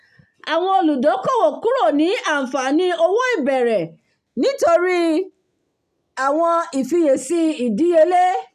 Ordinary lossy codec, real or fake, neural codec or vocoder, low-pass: none; real; none; 14.4 kHz